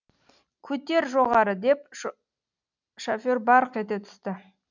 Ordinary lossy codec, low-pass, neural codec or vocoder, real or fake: none; 7.2 kHz; none; real